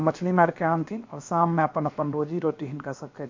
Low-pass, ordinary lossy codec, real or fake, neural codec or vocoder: 7.2 kHz; MP3, 48 kbps; fake; codec, 16 kHz, about 1 kbps, DyCAST, with the encoder's durations